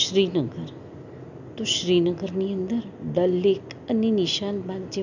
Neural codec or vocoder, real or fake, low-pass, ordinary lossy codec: none; real; 7.2 kHz; none